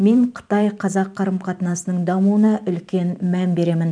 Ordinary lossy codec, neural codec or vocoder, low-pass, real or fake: none; vocoder, 44.1 kHz, 128 mel bands every 512 samples, BigVGAN v2; 9.9 kHz; fake